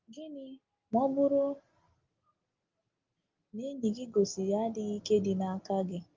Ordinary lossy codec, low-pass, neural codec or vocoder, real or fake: Opus, 32 kbps; 7.2 kHz; none; real